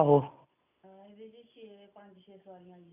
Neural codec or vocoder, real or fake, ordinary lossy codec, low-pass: none; real; none; 3.6 kHz